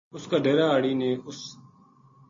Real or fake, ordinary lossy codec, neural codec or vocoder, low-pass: real; MP3, 32 kbps; none; 7.2 kHz